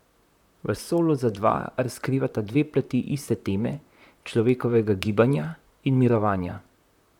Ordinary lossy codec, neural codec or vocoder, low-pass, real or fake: none; vocoder, 44.1 kHz, 128 mel bands, Pupu-Vocoder; 19.8 kHz; fake